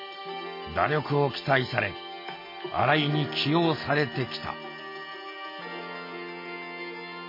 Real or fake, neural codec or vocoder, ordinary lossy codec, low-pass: real; none; MP3, 24 kbps; 5.4 kHz